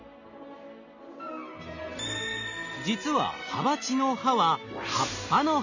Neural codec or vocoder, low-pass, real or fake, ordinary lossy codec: none; 7.2 kHz; real; none